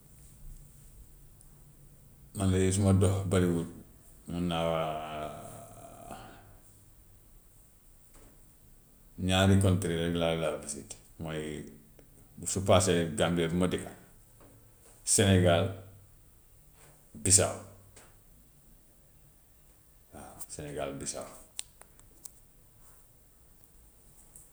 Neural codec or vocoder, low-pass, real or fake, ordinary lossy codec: none; none; real; none